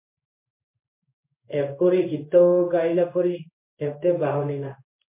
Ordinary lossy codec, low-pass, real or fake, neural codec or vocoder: MP3, 24 kbps; 3.6 kHz; fake; codec, 16 kHz in and 24 kHz out, 1 kbps, XY-Tokenizer